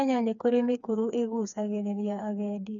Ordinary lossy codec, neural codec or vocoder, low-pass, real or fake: none; codec, 16 kHz, 4 kbps, FreqCodec, smaller model; 7.2 kHz; fake